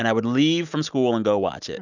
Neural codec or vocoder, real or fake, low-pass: none; real; 7.2 kHz